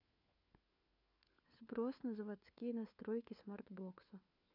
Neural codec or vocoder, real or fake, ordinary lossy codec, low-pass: codec, 16 kHz, 4 kbps, FunCodec, trained on LibriTTS, 50 frames a second; fake; none; 5.4 kHz